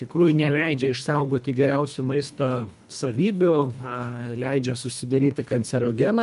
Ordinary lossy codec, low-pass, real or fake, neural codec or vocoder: MP3, 96 kbps; 10.8 kHz; fake; codec, 24 kHz, 1.5 kbps, HILCodec